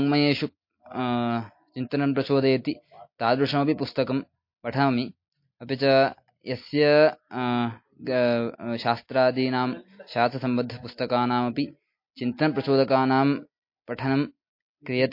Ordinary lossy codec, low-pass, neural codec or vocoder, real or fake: MP3, 32 kbps; 5.4 kHz; none; real